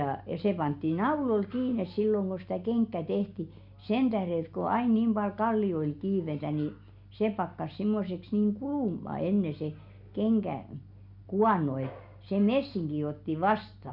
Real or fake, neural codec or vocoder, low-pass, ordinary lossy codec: real; none; 5.4 kHz; none